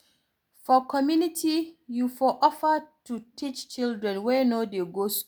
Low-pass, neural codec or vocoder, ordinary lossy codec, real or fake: none; none; none; real